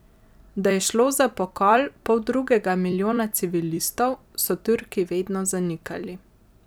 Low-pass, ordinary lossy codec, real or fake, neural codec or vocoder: none; none; fake; vocoder, 44.1 kHz, 128 mel bands every 256 samples, BigVGAN v2